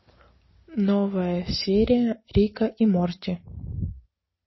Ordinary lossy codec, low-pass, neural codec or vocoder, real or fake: MP3, 24 kbps; 7.2 kHz; none; real